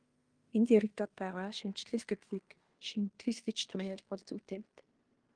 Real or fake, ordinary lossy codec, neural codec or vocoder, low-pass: fake; Opus, 24 kbps; codec, 16 kHz in and 24 kHz out, 0.9 kbps, LongCat-Audio-Codec, fine tuned four codebook decoder; 9.9 kHz